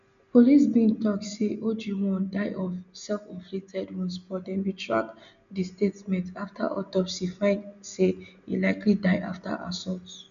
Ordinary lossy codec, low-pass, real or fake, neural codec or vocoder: none; 7.2 kHz; real; none